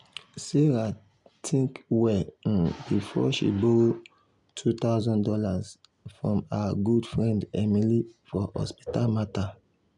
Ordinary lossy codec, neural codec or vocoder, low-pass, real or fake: MP3, 96 kbps; none; 10.8 kHz; real